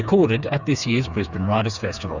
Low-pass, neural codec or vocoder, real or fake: 7.2 kHz; codec, 16 kHz, 4 kbps, FreqCodec, smaller model; fake